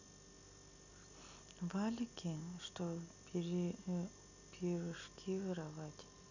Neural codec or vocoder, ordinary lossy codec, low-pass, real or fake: none; none; 7.2 kHz; real